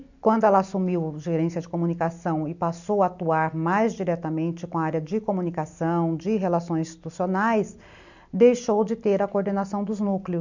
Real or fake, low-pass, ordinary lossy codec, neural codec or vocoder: real; 7.2 kHz; none; none